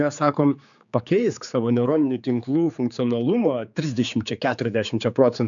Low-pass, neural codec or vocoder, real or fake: 7.2 kHz; codec, 16 kHz, 4 kbps, X-Codec, HuBERT features, trained on general audio; fake